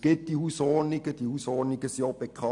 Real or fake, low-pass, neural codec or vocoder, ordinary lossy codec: real; 10.8 kHz; none; none